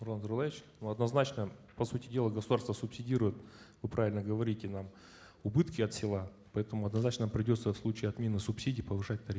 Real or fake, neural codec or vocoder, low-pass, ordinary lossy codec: real; none; none; none